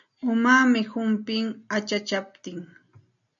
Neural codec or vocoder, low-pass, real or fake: none; 7.2 kHz; real